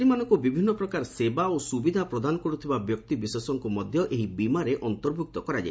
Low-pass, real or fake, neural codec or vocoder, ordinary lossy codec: none; real; none; none